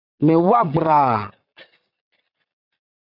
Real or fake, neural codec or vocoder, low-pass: fake; vocoder, 22.05 kHz, 80 mel bands, Vocos; 5.4 kHz